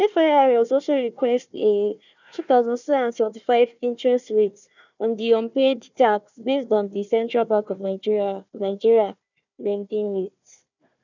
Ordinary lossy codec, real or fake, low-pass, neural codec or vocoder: none; fake; 7.2 kHz; codec, 16 kHz, 1 kbps, FunCodec, trained on Chinese and English, 50 frames a second